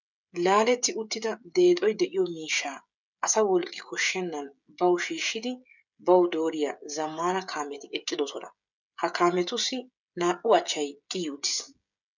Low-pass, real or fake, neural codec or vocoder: 7.2 kHz; fake; codec, 16 kHz, 16 kbps, FreqCodec, smaller model